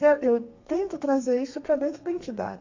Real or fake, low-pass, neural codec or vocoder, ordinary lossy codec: fake; 7.2 kHz; codec, 32 kHz, 1.9 kbps, SNAC; AAC, 48 kbps